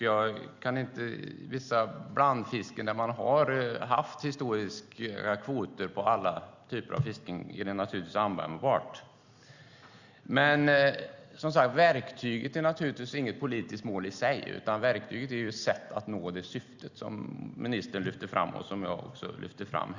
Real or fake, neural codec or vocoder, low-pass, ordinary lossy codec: real; none; 7.2 kHz; Opus, 64 kbps